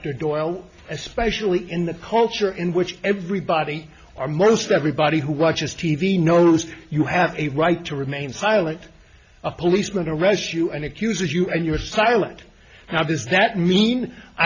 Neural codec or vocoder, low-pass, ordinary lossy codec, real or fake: none; 7.2 kHz; Opus, 64 kbps; real